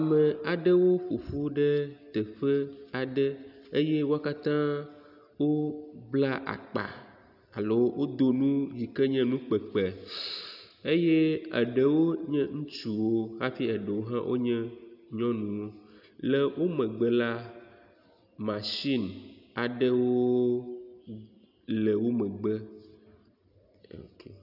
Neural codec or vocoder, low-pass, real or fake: none; 5.4 kHz; real